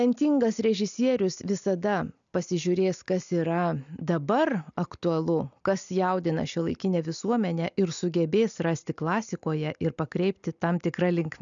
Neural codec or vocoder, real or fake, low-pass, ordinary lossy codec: none; real; 7.2 kHz; MP3, 96 kbps